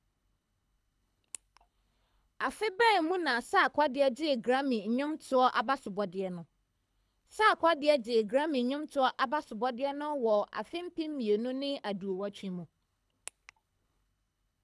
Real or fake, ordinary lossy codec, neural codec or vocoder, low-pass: fake; none; codec, 24 kHz, 6 kbps, HILCodec; none